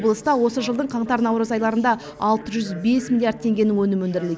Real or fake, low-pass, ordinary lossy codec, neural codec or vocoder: real; none; none; none